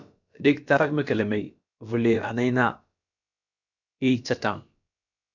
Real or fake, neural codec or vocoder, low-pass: fake; codec, 16 kHz, about 1 kbps, DyCAST, with the encoder's durations; 7.2 kHz